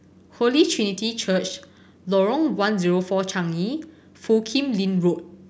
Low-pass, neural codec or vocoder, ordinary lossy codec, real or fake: none; none; none; real